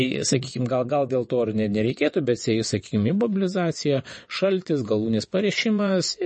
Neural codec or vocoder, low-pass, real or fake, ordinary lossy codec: vocoder, 22.05 kHz, 80 mel bands, WaveNeXt; 9.9 kHz; fake; MP3, 32 kbps